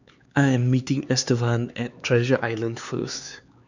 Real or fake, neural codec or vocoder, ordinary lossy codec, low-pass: fake; codec, 16 kHz, 4 kbps, X-Codec, HuBERT features, trained on LibriSpeech; none; 7.2 kHz